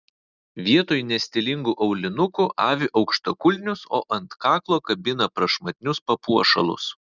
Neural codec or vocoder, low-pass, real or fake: none; 7.2 kHz; real